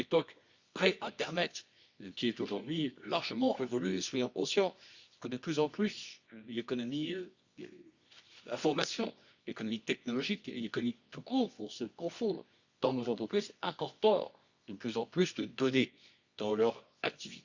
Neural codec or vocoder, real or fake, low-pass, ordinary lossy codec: codec, 24 kHz, 0.9 kbps, WavTokenizer, medium music audio release; fake; 7.2 kHz; Opus, 64 kbps